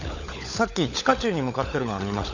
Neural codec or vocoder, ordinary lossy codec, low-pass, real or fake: codec, 16 kHz, 16 kbps, FunCodec, trained on LibriTTS, 50 frames a second; none; 7.2 kHz; fake